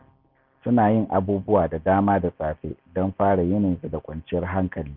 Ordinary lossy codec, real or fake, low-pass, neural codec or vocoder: none; real; 5.4 kHz; none